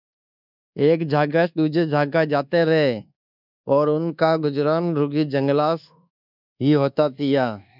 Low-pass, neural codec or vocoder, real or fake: 5.4 kHz; codec, 24 kHz, 1.2 kbps, DualCodec; fake